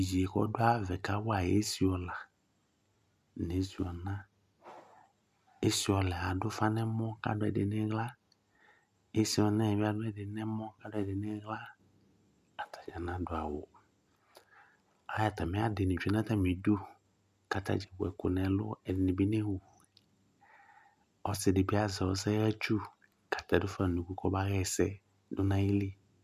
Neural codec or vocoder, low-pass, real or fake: none; 14.4 kHz; real